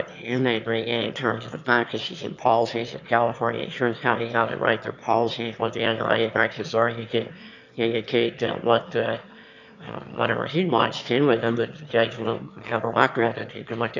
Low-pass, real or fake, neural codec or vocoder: 7.2 kHz; fake; autoencoder, 22.05 kHz, a latent of 192 numbers a frame, VITS, trained on one speaker